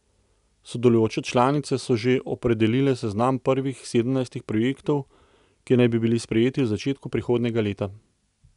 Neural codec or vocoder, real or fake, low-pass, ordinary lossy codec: none; real; 10.8 kHz; none